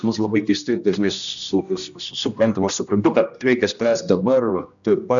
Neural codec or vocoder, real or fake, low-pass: codec, 16 kHz, 1 kbps, X-Codec, HuBERT features, trained on general audio; fake; 7.2 kHz